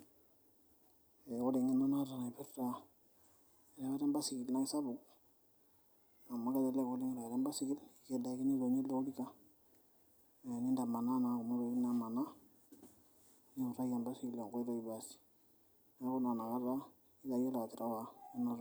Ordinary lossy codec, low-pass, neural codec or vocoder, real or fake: none; none; none; real